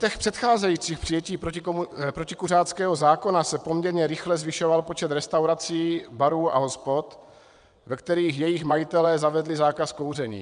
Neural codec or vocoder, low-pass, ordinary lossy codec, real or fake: vocoder, 22.05 kHz, 80 mel bands, WaveNeXt; 9.9 kHz; MP3, 96 kbps; fake